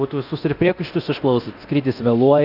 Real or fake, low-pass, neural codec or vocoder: fake; 5.4 kHz; codec, 24 kHz, 0.9 kbps, DualCodec